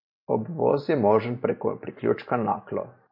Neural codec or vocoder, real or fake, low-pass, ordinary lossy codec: vocoder, 44.1 kHz, 128 mel bands every 512 samples, BigVGAN v2; fake; 5.4 kHz; MP3, 32 kbps